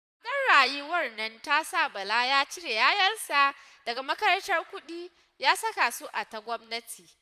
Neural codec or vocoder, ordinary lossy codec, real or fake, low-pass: vocoder, 44.1 kHz, 128 mel bands, Pupu-Vocoder; none; fake; 14.4 kHz